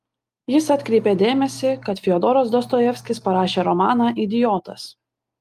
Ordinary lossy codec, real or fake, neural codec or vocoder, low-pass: Opus, 32 kbps; real; none; 14.4 kHz